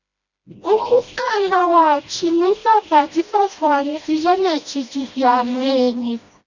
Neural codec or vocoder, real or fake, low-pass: codec, 16 kHz, 1 kbps, FreqCodec, smaller model; fake; 7.2 kHz